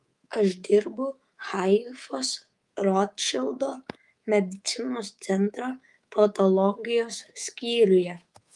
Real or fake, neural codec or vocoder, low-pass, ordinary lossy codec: fake; codec, 24 kHz, 3.1 kbps, DualCodec; 10.8 kHz; Opus, 32 kbps